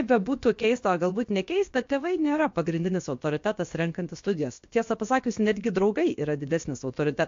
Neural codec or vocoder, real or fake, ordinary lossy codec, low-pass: codec, 16 kHz, about 1 kbps, DyCAST, with the encoder's durations; fake; MP3, 48 kbps; 7.2 kHz